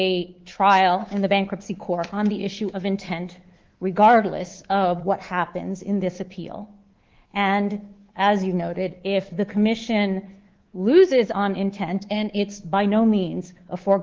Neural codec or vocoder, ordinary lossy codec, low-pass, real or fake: codec, 24 kHz, 3.1 kbps, DualCodec; Opus, 16 kbps; 7.2 kHz; fake